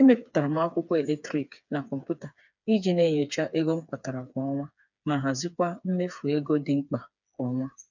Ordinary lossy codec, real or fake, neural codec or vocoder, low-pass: none; fake; codec, 16 kHz, 4 kbps, FreqCodec, smaller model; 7.2 kHz